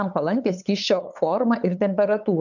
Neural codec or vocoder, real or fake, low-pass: codec, 16 kHz, 8 kbps, FunCodec, trained on LibriTTS, 25 frames a second; fake; 7.2 kHz